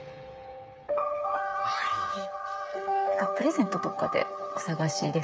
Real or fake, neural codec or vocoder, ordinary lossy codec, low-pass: fake; codec, 16 kHz, 8 kbps, FreqCodec, smaller model; none; none